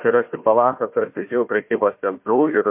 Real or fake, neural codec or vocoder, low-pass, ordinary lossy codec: fake; codec, 16 kHz, 1 kbps, FunCodec, trained on Chinese and English, 50 frames a second; 3.6 kHz; MP3, 32 kbps